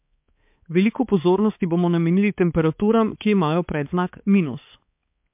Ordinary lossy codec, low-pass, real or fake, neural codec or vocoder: MP3, 32 kbps; 3.6 kHz; fake; codec, 16 kHz, 4 kbps, X-Codec, HuBERT features, trained on balanced general audio